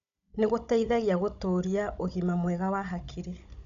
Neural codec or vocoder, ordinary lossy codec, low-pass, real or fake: codec, 16 kHz, 16 kbps, FunCodec, trained on Chinese and English, 50 frames a second; none; 7.2 kHz; fake